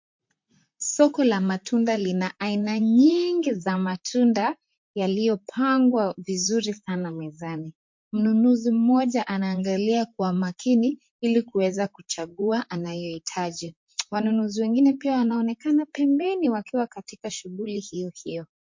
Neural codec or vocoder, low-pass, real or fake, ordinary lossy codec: vocoder, 44.1 kHz, 128 mel bands, Pupu-Vocoder; 7.2 kHz; fake; MP3, 48 kbps